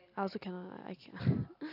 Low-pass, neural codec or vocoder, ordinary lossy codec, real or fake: 5.4 kHz; none; AAC, 48 kbps; real